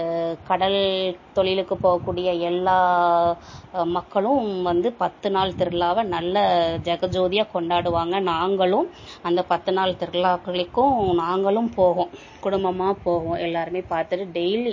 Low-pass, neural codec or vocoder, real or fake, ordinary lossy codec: 7.2 kHz; none; real; MP3, 32 kbps